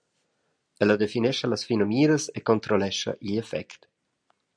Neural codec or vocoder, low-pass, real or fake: none; 9.9 kHz; real